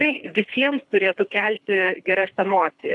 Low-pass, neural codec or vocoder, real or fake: 10.8 kHz; codec, 24 kHz, 3 kbps, HILCodec; fake